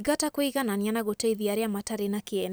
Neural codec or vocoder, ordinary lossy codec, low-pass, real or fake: none; none; none; real